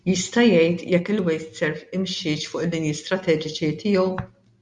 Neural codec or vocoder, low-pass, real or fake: none; 10.8 kHz; real